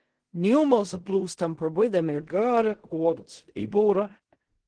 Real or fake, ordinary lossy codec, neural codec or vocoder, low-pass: fake; Opus, 16 kbps; codec, 16 kHz in and 24 kHz out, 0.4 kbps, LongCat-Audio-Codec, fine tuned four codebook decoder; 9.9 kHz